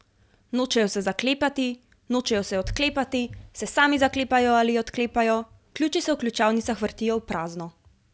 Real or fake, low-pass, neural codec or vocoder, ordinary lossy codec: real; none; none; none